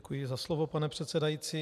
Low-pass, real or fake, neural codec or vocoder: 14.4 kHz; real; none